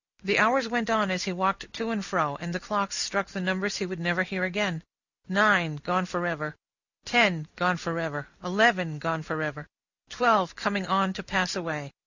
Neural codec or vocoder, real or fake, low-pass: none; real; 7.2 kHz